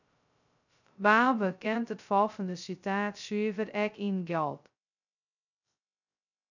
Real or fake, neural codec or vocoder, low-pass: fake; codec, 16 kHz, 0.2 kbps, FocalCodec; 7.2 kHz